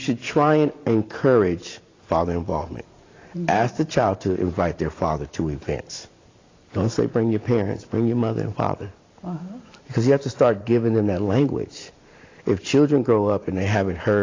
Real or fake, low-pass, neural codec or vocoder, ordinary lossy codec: fake; 7.2 kHz; vocoder, 44.1 kHz, 128 mel bands every 512 samples, BigVGAN v2; AAC, 32 kbps